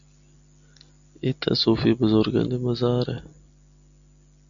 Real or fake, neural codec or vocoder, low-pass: real; none; 7.2 kHz